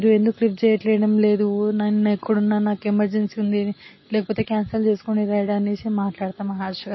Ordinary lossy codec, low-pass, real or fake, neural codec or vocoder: MP3, 24 kbps; 7.2 kHz; real; none